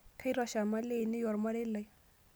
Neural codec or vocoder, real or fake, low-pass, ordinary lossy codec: none; real; none; none